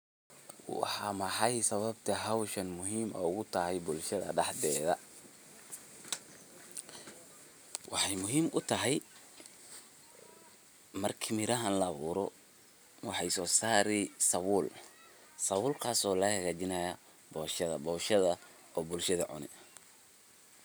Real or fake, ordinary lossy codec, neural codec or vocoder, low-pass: real; none; none; none